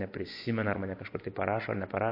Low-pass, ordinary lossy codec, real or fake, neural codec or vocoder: 5.4 kHz; MP3, 32 kbps; fake; vocoder, 24 kHz, 100 mel bands, Vocos